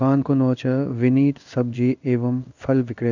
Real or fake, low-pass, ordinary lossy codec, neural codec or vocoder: fake; 7.2 kHz; none; codec, 16 kHz in and 24 kHz out, 1 kbps, XY-Tokenizer